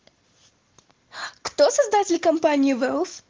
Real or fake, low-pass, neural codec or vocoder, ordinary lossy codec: real; 7.2 kHz; none; Opus, 16 kbps